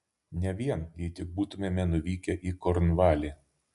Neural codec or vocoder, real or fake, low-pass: none; real; 10.8 kHz